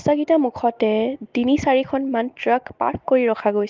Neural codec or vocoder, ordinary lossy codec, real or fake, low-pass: none; Opus, 32 kbps; real; 7.2 kHz